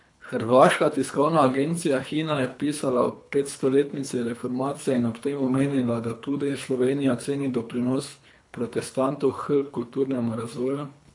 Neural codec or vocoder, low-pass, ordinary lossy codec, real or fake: codec, 24 kHz, 3 kbps, HILCodec; 10.8 kHz; AAC, 64 kbps; fake